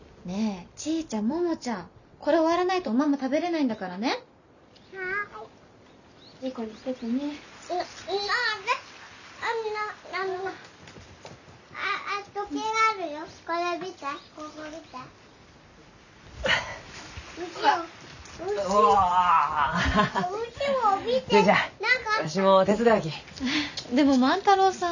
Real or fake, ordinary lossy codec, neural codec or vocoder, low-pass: real; none; none; 7.2 kHz